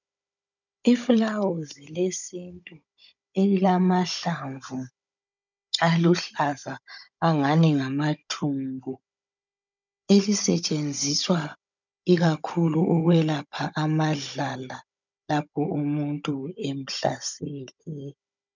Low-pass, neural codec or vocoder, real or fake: 7.2 kHz; codec, 16 kHz, 16 kbps, FunCodec, trained on Chinese and English, 50 frames a second; fake